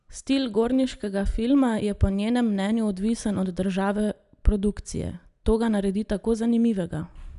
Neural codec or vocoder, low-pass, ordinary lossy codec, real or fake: none; 10.8 kHz; none; real